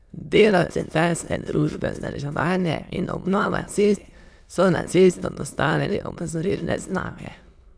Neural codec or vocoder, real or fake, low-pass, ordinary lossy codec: autoencoder, 22.05 kHz, a latent of 192 numbers a frame, VITS, trained on many speakers; fake; none; none